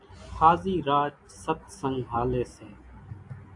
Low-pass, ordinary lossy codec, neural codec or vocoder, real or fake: 10.8 kHz; AAC, 64 kbps; none; real